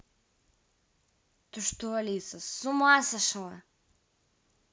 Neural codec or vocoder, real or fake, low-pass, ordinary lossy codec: none; real; none; none